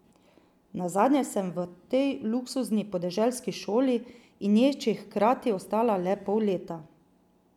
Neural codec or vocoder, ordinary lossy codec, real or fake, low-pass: none; none; real; 19.8 kHz